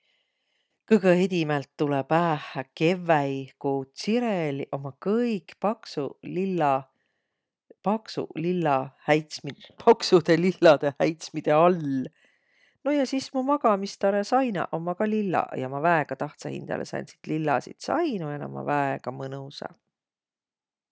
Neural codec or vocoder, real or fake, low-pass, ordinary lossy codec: none; real; none; none